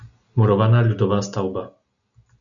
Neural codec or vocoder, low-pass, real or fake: none; 7.2 kHz; real